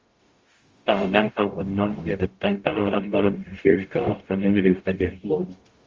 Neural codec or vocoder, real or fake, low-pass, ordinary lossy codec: codec, 44.1 kHz, 0.9 kbps, DAC; fake; 7.2 kHz; Opus, 32 kbps